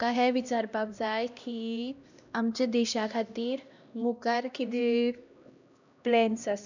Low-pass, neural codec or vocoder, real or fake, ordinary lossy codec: 7.2 kHz; codec, 16 kHz, 1 kbps, X-Codec, HuBERT features, trained on LibriSpeech; fake; none